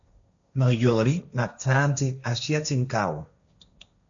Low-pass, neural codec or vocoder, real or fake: 7.2 kHz; codec, 16 kHz, 1.1 kbps, Voila-Tokenizer; fake